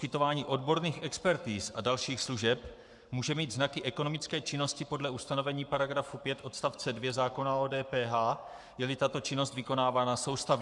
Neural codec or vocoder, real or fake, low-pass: codec, 44.1 kHz, 7.8 kbps, Pupu-Codec; fake; 10.8 kHz